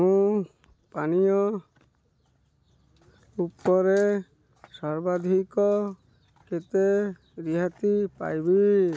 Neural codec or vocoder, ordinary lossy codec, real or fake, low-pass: none; none; real; none